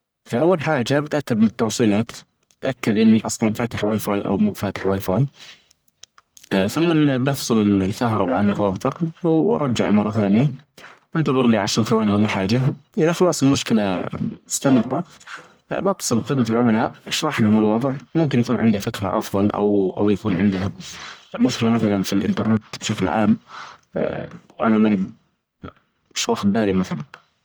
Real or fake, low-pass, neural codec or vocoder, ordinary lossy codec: fake; none; codec, 44.1 kHz, 1.7 kbps, Pupu-Codec; none